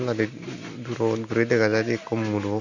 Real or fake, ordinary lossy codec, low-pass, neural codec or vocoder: real; none; 7.2 kHz; none